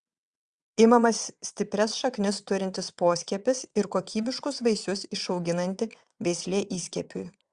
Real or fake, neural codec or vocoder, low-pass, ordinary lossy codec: fake; vocoder, 22.05 kHz, 80 mel bands, WaveNeXt; 9.9 kHz; Opus, 64 kbps